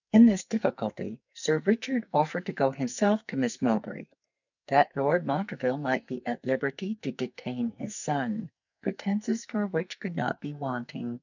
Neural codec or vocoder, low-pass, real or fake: codec, 44.1 kHz, 2.6 kbps, SNAC; 7.2 kHz; fake